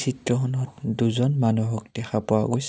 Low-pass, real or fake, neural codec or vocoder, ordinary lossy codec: none; real; none; none